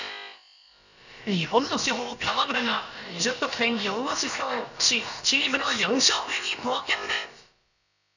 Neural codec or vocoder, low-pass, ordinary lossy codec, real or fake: codec, 16 kHz, about 1 kbps, DyCAST, with the encoder's durations; 7.2 kHz; none; fake